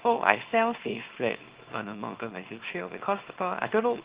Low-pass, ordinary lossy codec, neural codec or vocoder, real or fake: 3.6 kHz; Opus, 16 kbps; codec, 24 kHz, 0.9 kbps, WavTokenizer, small release; fake